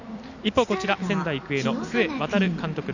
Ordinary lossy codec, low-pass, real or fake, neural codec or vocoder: none; 7.2 kHz; real; none